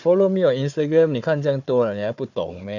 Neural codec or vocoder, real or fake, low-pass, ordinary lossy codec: codec, 16 kHz, 4 kbps, FunCodec, trained on Chinese and English, 50 frames a second; fake; 7.2 kHz; none